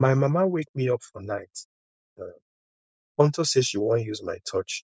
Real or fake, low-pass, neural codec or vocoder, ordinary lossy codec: fake; none; codec, 16 kHz, 4.8 kbps, FACodec; none